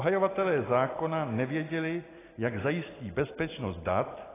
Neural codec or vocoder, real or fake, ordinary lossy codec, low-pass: none; real; AAC, 16 kbps; 3.6 kHz